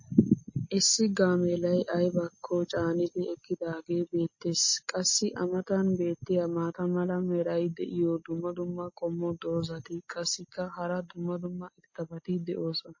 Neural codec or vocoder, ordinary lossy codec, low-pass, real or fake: none; MP3, 32 kbps; 7.2 kHz; real